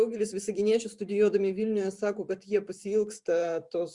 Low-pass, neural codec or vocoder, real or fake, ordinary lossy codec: 10.8 kHz; vocoder, 44.1 kHz, 128 mel bands every 512 samples, BigVGAN v2; fake; Opus, 64 kbps